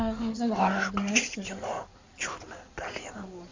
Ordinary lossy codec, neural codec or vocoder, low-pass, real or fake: MP3, 64 kbps; codec, 16 kHz in and 24 kHz out, 2.2 kbps, FireRedTTS-2 codec; 7.2 kHz; fake